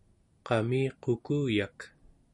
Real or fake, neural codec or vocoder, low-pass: real; none; 10.8 kHz